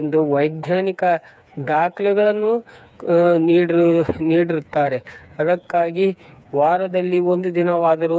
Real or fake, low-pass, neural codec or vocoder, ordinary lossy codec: fake; none; codec, 16 kHz, 4 kbps, FreqCodec, smaller model; none